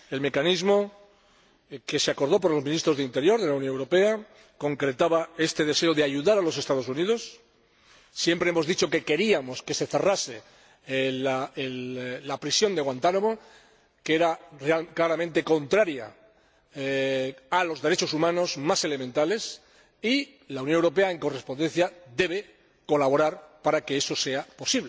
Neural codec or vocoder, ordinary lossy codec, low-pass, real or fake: none; none; none; real